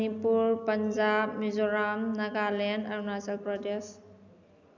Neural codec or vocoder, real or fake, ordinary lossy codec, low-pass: none; real; none; 7.2 kHz